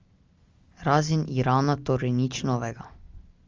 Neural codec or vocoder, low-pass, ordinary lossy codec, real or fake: none; 7.2 kHz; Opus, 32 kbps; real